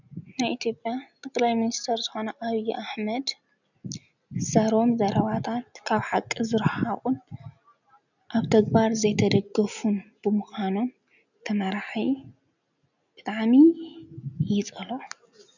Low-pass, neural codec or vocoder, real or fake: 7.2 kHz; none; real